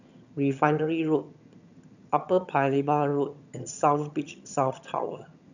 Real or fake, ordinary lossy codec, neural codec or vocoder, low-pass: fake; none; vocoder, 22.05 kHz, 80 mel bands, HiFi-GAN; 7.2 kHz